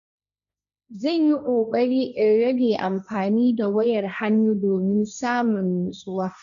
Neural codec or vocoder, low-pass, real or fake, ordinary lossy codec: codec, 16 kHz, 1.1 kbps, Voila-Tokenizer; 7.2 kHz; fake; none